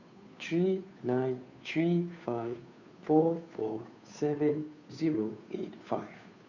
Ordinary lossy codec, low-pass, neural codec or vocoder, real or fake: none; 7.2 kHz; codec, 16 kHz, 2 kbps, FunCodec, trained on Chinese and English, 25 frames a second; fake